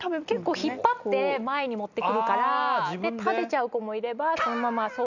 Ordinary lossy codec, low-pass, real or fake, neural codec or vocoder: none; 7.2 kHz; real; none